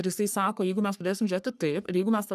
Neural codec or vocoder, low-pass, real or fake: codec, 44.1 kHz, 3.4 kbps, Pupu-Codec; 14.4 kHz; fake